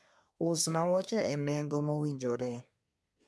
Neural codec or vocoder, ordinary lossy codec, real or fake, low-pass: codec, 24 kHz, 1 kbps, SNAC; none; fake; none